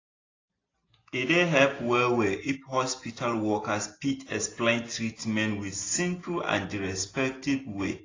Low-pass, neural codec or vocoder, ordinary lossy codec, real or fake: 7.2 kHz; none; AAC, 32 kbps; real